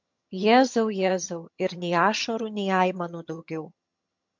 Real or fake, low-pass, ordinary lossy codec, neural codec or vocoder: fake; 7.2 kHz; MP3, 48 kbps; vocoder, 22.05 kHz, 80 mel bands, HiFi-GAN